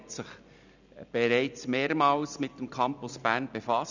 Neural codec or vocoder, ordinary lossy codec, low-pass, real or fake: none; none; 7.2 kHz; real